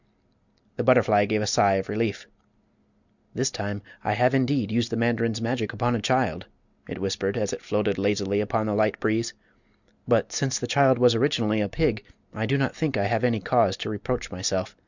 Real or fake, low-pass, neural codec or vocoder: real; 7.2 kHz; none